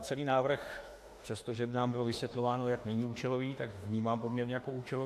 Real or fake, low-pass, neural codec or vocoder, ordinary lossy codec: fake; 14.4 kHz; autoencoder, 48 kHz, 32 numbers a frame, DAC-VAE, trained on Japanese speech; AAC, 64 kbps